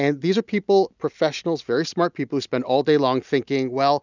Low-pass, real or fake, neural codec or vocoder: 7.2 kHz; real; none